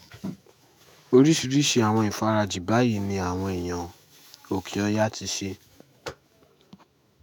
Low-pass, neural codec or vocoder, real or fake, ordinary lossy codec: none; autoencoder, 48 kHz, 128 numbers a frame, DAC-VAE, trained on Japanese speech; fake; none